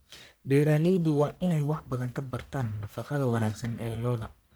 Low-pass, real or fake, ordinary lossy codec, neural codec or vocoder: none; fake; none; codec, 44.1 kHz, 1.7 kbps, Pupu-Codec